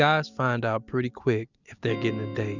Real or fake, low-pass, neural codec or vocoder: real; 7.2 kHz; none